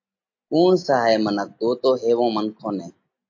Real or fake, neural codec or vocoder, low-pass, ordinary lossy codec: real; none; 7.2 kHz; AAC, 48 kbps